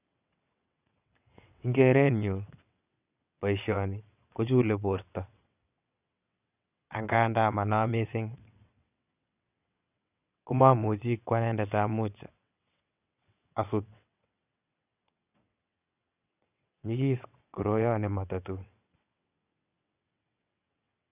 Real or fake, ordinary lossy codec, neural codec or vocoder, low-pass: fake; none; vocoder, 22.05 kHz, 80 mel bands, WaveNeXt; 3.6 kHz